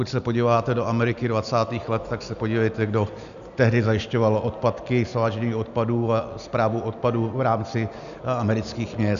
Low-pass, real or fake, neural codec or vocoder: 7.2 kHz; real; none